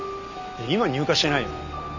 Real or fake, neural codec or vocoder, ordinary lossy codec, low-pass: real; none; none; 7.2 kHz